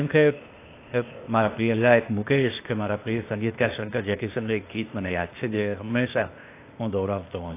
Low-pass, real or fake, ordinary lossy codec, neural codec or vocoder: 3.6 kHz; fake; AAC, 24 kbps; codec, 16 kHz, 0.8 kbps, ZipCodec